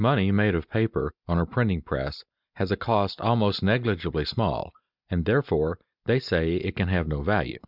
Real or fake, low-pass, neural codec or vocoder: real; 5.4 kHz; none